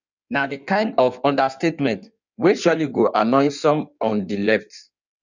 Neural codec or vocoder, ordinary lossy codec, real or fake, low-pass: codec, 16 kHz in and 24 kHz out, 1.1 kbps, FireRedTTS-2 codec; none; fake; 7.2 kHz